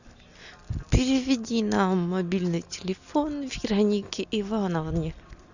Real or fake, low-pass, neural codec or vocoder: real; 7.2 kHz; none